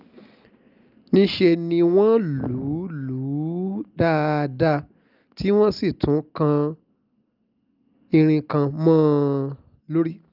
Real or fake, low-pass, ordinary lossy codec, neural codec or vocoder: real; 5.4 kHz; Opus, 24 kbps; none